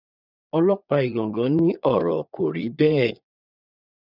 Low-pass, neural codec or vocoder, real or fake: 5.4 kHz; vocoder, 22.05 kHz, 80 mel bands, Vocos; fake